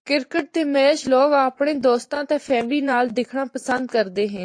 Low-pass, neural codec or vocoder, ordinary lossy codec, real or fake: 9.9 kHz; vocoder, 24 kHz, 100 mel bands, Vocos; AAC, 48 kbps; fake